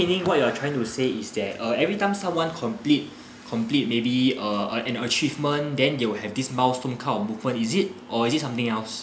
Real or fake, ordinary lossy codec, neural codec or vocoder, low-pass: real; none; none; none